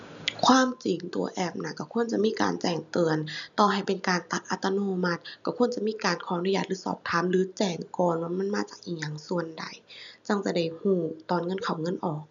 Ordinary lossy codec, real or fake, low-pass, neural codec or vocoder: none; real; 7.2 kHz; none